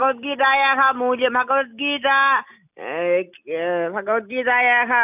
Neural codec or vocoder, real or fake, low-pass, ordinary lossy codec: none; real; 3.6 kHz; none